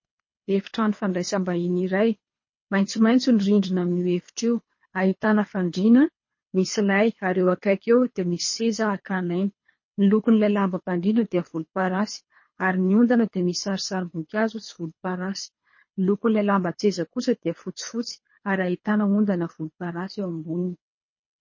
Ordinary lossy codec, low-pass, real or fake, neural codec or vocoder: MP3, 32 kbps; 7.2 kHz; fake; codec, 24 kHz, 3 kbps, HILCodec